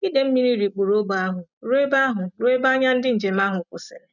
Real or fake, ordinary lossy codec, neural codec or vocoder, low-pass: real; none; none; 7.2 kHz